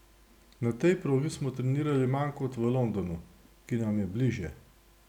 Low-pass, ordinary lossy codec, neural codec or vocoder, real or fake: 19.8 kHz; none; none; real